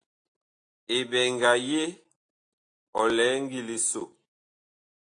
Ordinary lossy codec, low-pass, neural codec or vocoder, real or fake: AAC, 48 kbps; 9.9 kHz; none; real